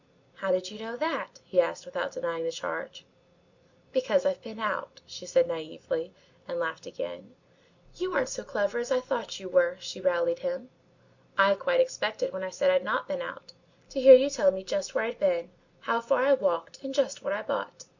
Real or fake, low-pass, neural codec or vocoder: real; 7.2 kHz; none